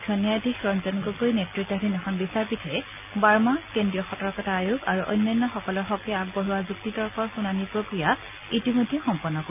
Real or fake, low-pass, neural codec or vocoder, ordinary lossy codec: real; 3.6 kHz; none; none